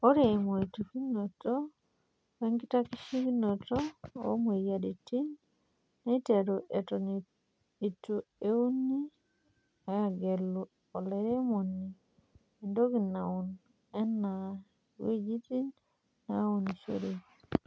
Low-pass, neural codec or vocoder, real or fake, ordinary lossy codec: none; none; real; none